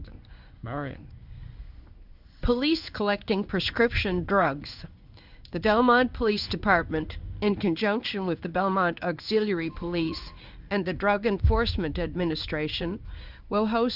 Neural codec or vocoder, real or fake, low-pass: codec, 16 kHz in and 24 kHz out, 1 kbps, XY-Tokenizer; fake; 5.4 kHz